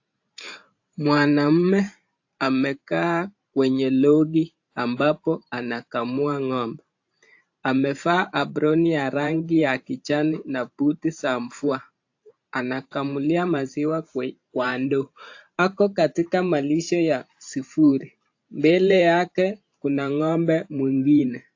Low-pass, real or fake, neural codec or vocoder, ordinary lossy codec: 7.2 kHz; fake; vocoder, 44.1 kHz, 128 mel bands every 512 samples, BigVGAN v2; AAC, 48 kbps